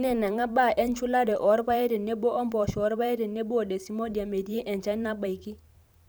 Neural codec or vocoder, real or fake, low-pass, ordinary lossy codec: vocoder, 44.1 kHz, 128 mel bands every 512 samples, BigVGAN v2; fake; none; none